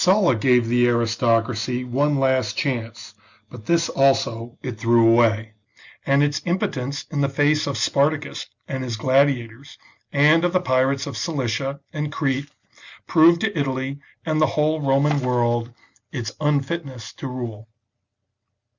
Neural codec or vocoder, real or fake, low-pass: none; real; 7.2 kHz